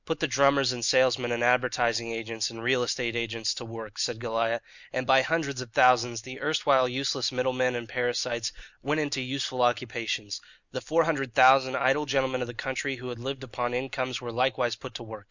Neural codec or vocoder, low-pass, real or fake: none; 7.2 kHz; real